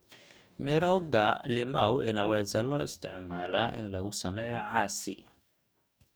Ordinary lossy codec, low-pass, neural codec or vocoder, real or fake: none; none; codec, 44.1 kHz, 2.6 kbps, DAC; fake